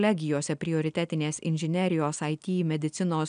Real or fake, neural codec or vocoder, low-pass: real; none; 9.9 kHz